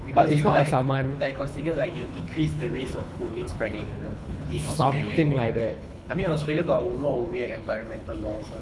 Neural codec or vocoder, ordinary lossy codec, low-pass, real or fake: codec, 24 kHz, 3 kbps, HILCodec; none; none; fake